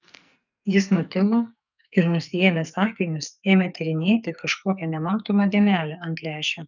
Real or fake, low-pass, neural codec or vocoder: fake; 7.2 kHz; codec, 44.1 kHz, 2.6 kbps, SNAC